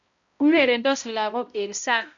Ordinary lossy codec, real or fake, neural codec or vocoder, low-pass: none; fake; codec, 16 kHz, 0.5 kbps, X-Codec, HuBERT features, trained on balanced general audio; 7.2 kHz